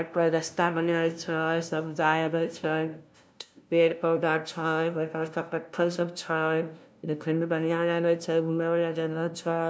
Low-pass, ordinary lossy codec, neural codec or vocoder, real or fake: none; none; codec, 16 kHz, 0.5 kbps, FunCodec, trained on LibriTTS, 25 frames a second; fake